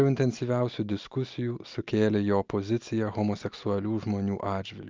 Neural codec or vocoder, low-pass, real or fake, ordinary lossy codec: none; 7.2 kHz; real; Opus, 24 kbps